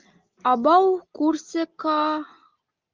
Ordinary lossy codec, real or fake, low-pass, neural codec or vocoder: Opus, 24 kbps; real; 7.2 kHz; none